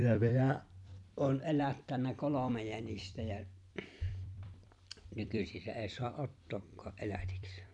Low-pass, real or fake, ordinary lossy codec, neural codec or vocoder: 10.8 kHz; fake; none; vocoder, 44.1 kHz, 128 mel bands every 256 samples, BigVGAN v2